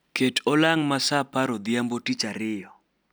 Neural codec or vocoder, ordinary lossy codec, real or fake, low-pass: none; none; real; none